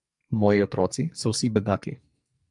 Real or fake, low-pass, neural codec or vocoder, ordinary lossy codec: fake; 10.8 kHz; codec, 44.1 kHz, 2.6 kbps, SNAC; MP3, 96 kbps